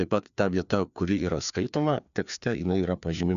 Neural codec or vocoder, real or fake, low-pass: codec, 16 kHz, 2 kbps, FreqCodec, larger model; fake; 7.2 kHz